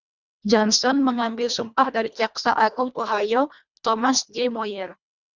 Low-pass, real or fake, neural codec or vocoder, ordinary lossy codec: 7.2 kHz; fake; codec, 24 kHz, 1.5 kbps, HILCodec; Opus, 64 kbps